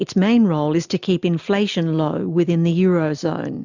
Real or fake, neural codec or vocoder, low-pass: real; none; 7.2 kHz